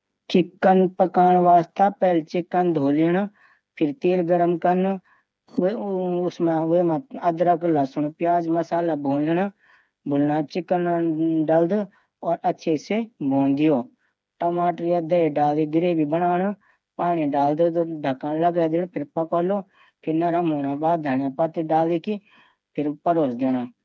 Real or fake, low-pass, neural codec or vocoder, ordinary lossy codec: fake; none; codec, 16 kHz, 4 kbps, FreqCodec, smaller model; none